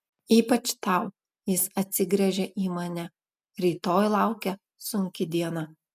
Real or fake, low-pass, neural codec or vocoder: real; 14.4 kHz; none